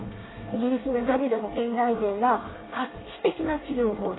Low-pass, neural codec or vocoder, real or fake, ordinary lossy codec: 7.2 kHz; codec, 24 kHz, 1 kbps, SNAC; fake; AAC, 16 kbps